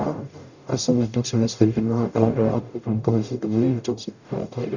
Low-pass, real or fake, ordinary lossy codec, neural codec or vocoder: 7.2 kHz; fake; none; codec, 44.1 kHz, 0.9 kbps, DAC